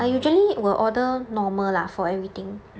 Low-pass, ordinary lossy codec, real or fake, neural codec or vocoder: none; none; real; none